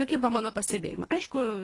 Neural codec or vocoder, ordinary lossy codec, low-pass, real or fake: codec, 24 kHz, 1.5 kbps, HILCodec; AAC, 32 kbps; 10.8 kHz; fake